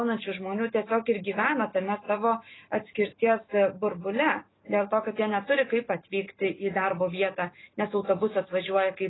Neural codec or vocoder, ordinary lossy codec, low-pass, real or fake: none; AAC, 16 kbps; 7.2 kHz; real